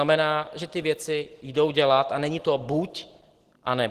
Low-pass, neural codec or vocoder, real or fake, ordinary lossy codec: 14.4 kHz; none; real; Opus, 16 kbps